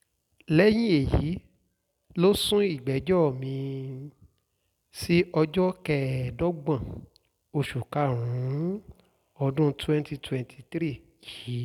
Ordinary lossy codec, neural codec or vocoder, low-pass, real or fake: none; none; 19.8 kHz; real